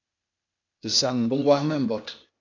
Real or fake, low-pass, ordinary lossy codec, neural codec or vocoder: fake; 7.2 kHz; AAC, 48 kbps; codec, 16 kHz, 0.8 kbps, ZipCodec